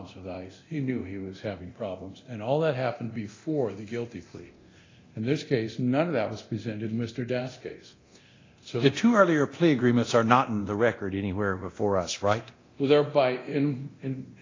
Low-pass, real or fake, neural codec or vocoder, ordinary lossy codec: 7.2 kHz; fake; codec, 24 kHz, 0.9 kbps, DualCodec; AAC, 32 kbps